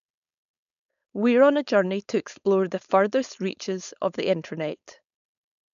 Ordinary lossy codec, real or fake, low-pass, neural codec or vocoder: none; fake; 7.2 kHz; codec, 16 kHz, 4.8 kbps, FACodec